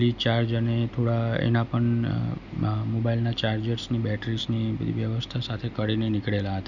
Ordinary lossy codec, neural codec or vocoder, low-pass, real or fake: none; none; 7.2 kHz; real